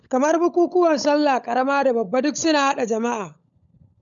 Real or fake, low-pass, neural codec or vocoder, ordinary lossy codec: fake; 7.2 kHz; codec, 16 kHz, 16 kbps, FunCodec, trained on LibriTTS, 50 frames a second; none